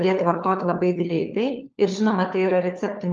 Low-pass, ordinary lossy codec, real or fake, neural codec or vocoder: 7.2 kHz; Opus, 24 kbps; fake; codec, 16 kHz, 4 kbps, FunCodec, trained on LibriTTS, 50 frames a second